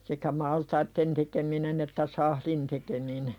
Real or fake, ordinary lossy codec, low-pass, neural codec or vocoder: real; MP3, 96 kbps; 19.8 kHz; none